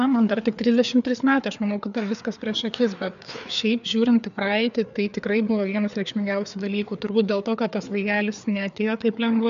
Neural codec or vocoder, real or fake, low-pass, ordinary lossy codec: codec, 16 kHz, 4 kbps, FreqCodec, larger model; fake; 7.2 kHz; AAC, 96 kbps